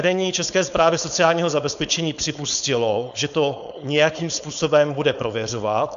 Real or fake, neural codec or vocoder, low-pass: fake; codec, 16 kHz, 4.8 kbps, FACodec; 7.2 kHz